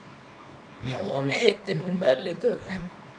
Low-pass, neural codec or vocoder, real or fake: 9.9 kHz; codec, 24 kHz, 0.9 kbps, WavTokenizer, small release; fake